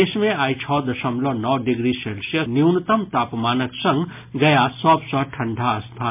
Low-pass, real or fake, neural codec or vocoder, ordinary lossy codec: 3.6 kHz; real; none; MP3, 32 kbps